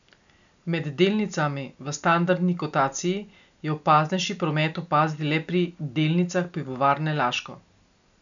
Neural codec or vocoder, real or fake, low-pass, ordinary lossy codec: none; real; 7.2 kHz; none